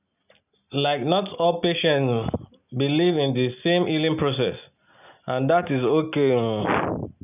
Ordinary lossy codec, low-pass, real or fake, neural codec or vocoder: none; 3.6 kHz; real; none